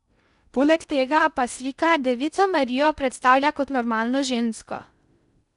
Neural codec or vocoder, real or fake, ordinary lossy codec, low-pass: codec, 16 kHz in and 24 kHz out, 0.6 kbps, FocalCodec, streaming, 2048 codes; fake; none; 10.8 kHz